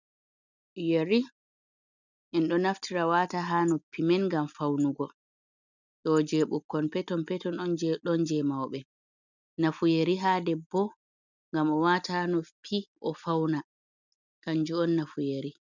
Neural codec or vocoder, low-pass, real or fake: none; 7.2 kHz; real